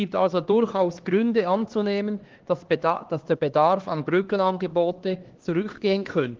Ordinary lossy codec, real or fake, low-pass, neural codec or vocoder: Opus, 16 kbps; fake; 7.2 kHz; codec, 16 kHz, 2 kbps, X-Codec, HuBERT features, trained on LibriSpeech